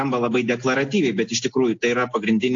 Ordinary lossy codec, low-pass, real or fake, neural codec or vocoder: AAC, 48 kbps; 7.2 kHz; real; none